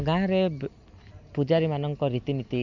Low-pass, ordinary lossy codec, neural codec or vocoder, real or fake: 7.2 kHz; none; none; real